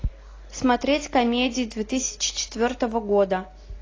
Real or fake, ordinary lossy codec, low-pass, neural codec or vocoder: real; AAC, 32 kbps; 7.2 kHz; none